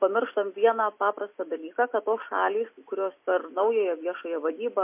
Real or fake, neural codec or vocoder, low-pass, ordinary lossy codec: real; none; 3.6 kHz; MP3, 24 kbps